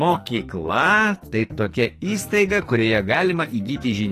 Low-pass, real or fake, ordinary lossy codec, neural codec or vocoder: 14.4 kHz; fake; AAC, 48 kbps; codec, 44.1 kHz, 2.6 kbps, SNAC